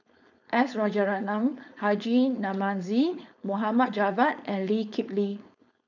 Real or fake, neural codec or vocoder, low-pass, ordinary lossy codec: fake; codec, 16 kHz, 4.8 kbps, FACodec; 7.2 kHz; none